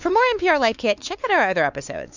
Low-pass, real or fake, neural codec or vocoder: 7.2 kHz; fake; codec, 16 kHz, 4 kbps, X-Codec, WavLM features, trained on Multilingual LibriSpeech